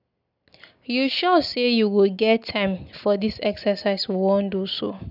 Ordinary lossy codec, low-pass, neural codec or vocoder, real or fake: none; 5.4 kHz; none; real